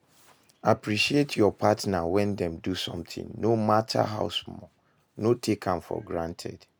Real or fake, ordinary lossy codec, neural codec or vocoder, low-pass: real; none; none; none